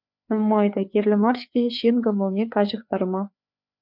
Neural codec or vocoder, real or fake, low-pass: codec, 16 kHz, 4 kbps, FreqCodec, larger model; fake; 5.4 kHz